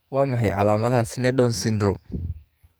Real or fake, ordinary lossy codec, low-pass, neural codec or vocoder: fake; none; none; codec, 44.1 kHz, 2.6 kbps, SNAC